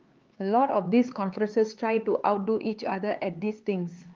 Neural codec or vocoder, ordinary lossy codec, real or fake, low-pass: codec, 16 kHz, 4 kbps, X-Codec, HuBERT features, trained on LibriSpeech; Opus, 32 kbps; fake; 7.2 kHz